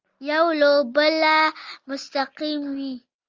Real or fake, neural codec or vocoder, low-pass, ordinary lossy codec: real; none; 7.2 kHz; Opus, 32 kbps